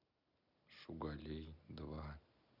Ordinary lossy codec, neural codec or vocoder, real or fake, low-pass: none; none; real; 5.4 kHz